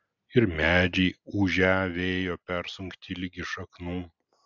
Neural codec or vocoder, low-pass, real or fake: none; 7.2 kHz; real